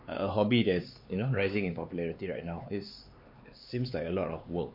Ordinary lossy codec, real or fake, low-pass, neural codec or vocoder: MP3, 32 kbps; fake; 5.4 kHz; codec, 16 kHz, 4 kbps, X-Codec, HuBERT features, trained on LibriSpeech